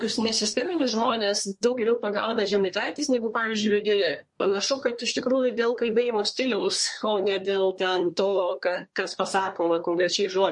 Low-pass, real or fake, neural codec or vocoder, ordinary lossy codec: 10.8 kHz; fake; codec, 24 kHz, 1 kbps, SNAC; MP3, 48 kbps